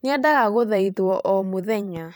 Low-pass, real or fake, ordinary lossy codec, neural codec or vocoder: none; fake; none; vocoder, 44.1 kHz, 128 mel bands every 512 samples, BigVGAN v2